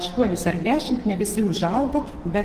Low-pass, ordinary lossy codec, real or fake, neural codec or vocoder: 14.4 kHz; Opus, 16 kbps; fake; codec, 44.1 kHz, 2.6 kbps, SNAC